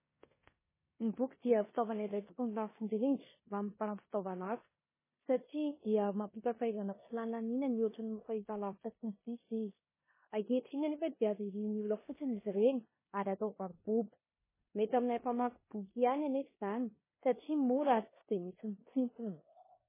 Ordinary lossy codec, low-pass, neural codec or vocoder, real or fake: MP3, 16 kbps; 3.6 kHz; codec, 16 kHz in and 24 kHz out, 0.9 kbps, LongCat-Audio-Codec, four codebook decoder; fake